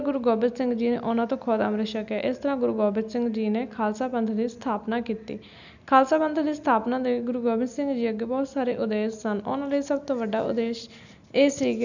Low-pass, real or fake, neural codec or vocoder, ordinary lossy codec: 7.2 kHz; real; none; none